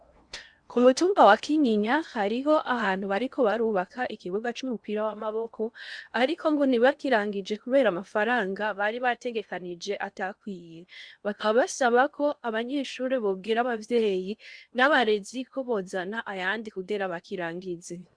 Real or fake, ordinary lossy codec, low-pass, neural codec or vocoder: fake; Opus, 64 kbps; 9.9 kHz; codec, 16 kHz in and 24 kHz out, 0.8 kbps, FocalCodec, streaming, 65536 codes